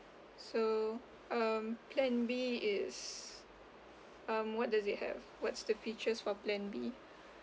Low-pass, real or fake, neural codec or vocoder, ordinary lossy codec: none; real; none; none